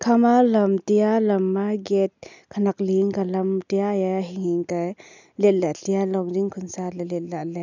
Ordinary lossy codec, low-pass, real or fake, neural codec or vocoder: none; 7.2 kHz; real; none